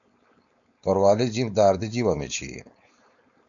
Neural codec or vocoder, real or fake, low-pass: codec, 16 kHz, 4.8 kbps, FACodec; fake; 7.2 kHz